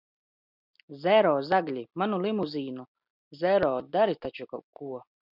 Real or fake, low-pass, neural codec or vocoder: real; 5.4 kHz; none